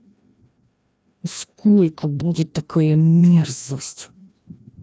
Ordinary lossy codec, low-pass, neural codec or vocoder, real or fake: none; none; codec, 16 kHz, 1 kbps, FreqCodec, larger model; fake